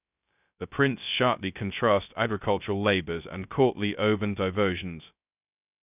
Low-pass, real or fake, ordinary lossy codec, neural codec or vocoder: 3.6 kHz; fake; none; codec, 16 kHz, 0.2 kbps, FocalCodec